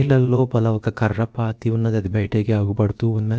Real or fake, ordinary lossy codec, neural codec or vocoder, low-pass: fake; none; codec, 16 kHz, about 1 kbps, DyCAST, with the encoder's durations; none